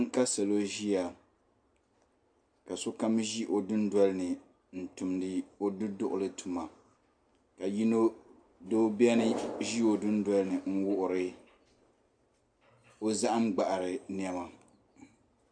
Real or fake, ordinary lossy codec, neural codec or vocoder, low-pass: real; AAC, 64 kbps; none; 9.9 kHz